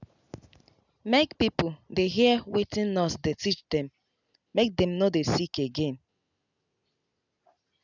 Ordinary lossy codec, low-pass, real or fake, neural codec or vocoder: none; 7.2 kHz; real; none